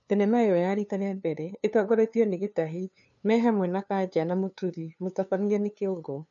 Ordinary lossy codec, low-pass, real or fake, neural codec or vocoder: none; 7.2 kHz; fake; codec, 16 kHz, 2 kbps, FunCodec, trained on LibriTTS, 25 frames a second